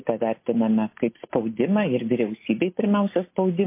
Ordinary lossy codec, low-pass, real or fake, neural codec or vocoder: MP3, 24 kbps; 3.6 kHz; real; none